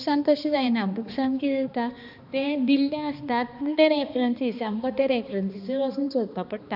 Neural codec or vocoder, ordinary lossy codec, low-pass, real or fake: codec, 16 kHz, 2 kbps, X-Codec, HuBERT features, trained on balanced general audio; AAC, 48 kbps; 5.4 kHz; fake